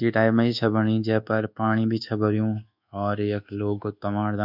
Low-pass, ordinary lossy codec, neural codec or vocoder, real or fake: 5.4 kHz; none; codec, 24 kHz, 1.2 kbps, DualCodec; fake